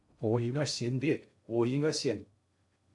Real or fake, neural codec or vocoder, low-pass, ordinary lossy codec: fake; codec, 16 kHz in and 24 kHz out, 0.6 kbps, FocalCodec, streaming, 2048 codes; 10.8 kHz; AAC, 64 kbps